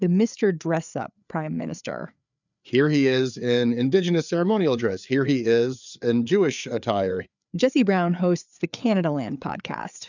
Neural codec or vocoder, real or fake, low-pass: codec, 16 kHz, 4 kbps, FreqCodec, larger model; fake; 7.2 kHz